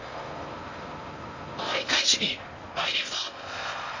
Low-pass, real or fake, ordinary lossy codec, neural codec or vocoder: 7.2 kHz; fake; MP3, 32 kbps; codec, 16 kHz in and 24 kHz out, 0.6 kbps, FocalCodec, streaming, 4096 codes